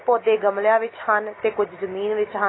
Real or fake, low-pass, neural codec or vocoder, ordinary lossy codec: real; 7.2 kHz; none; AAC, 16 kbps